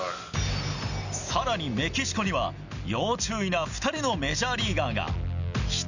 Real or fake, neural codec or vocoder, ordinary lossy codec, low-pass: real; none; none; 7.2 kHz